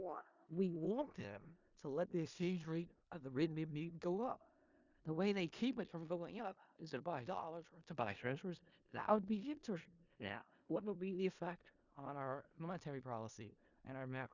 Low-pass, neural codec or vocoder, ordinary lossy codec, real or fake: 7.2 kHz; codec, 16 kHz in and 24 kHz out, 0.4 kbps, LongCat-Audio-Codec, four codebook decoder; Opus, 64 kbps; fake